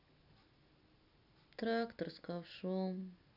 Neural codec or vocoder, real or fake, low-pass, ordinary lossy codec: none; real; 5.4 kHz; none